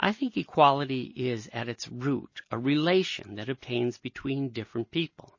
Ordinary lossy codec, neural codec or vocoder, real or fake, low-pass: MP3, 32 kbps; none; real; 7.2 kHz